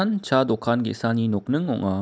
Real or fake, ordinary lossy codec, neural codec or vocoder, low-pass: real; none; none; none